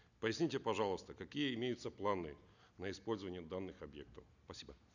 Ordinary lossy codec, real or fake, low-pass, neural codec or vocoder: none; real; 7.2 kHz; none